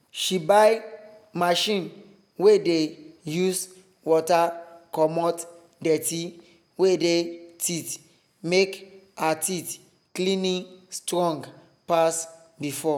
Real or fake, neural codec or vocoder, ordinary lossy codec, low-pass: real; none; none; none